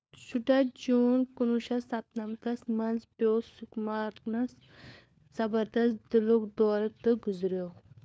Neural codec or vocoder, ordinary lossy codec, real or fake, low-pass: codec, 16 kHz, 4 kbps, FunCodec, trained on LibriTTS, 50 frames a second; none; fake; none